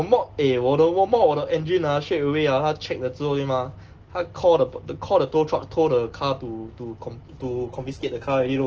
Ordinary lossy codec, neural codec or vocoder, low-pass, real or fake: Opus, 16 kbps; none; 7.2 kHz; real